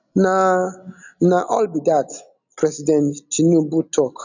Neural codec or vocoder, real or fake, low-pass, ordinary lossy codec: none; real; 7.2 kHz; none